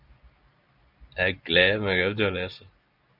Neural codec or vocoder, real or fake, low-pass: none; real; 5.4 kHz